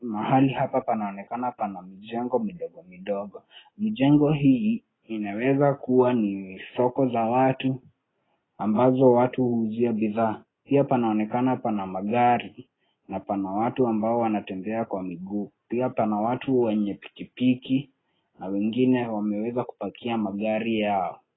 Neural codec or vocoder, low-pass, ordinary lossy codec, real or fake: none; 7.2 kHz; AAC, 16 kbps; real